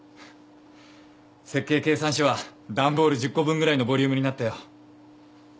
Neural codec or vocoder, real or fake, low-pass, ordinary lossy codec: none; real; none; none